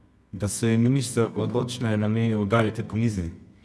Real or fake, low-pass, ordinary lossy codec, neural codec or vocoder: fake; none; none; codec, 24 kHz, 0.9 kbps, WavTokenizer, medium music audio release